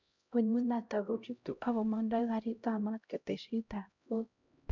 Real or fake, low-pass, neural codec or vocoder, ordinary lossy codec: fake; 7.2 kHz; codec, 16 kHz, 0.5 kbps, X-Codec, HuBERT features, trained on LibriSpeech; none